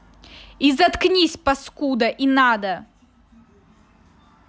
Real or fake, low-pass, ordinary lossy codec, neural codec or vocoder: real; none; none; none